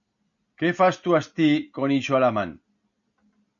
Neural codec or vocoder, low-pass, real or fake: none; 7.2 kHz; real